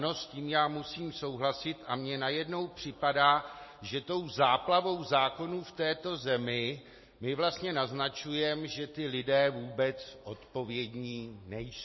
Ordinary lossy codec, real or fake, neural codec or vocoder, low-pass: MP3, 24 kbps; real; none; 7.2 kHz